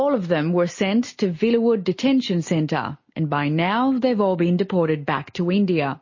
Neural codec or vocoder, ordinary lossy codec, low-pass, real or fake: none; MP3, 32 kbps; 7.2 kHz; real